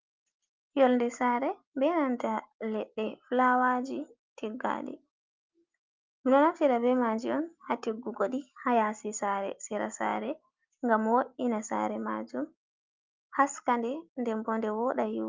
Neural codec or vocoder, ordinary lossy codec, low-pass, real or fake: none; Opus, 24 kbps; 7.2 kHz; real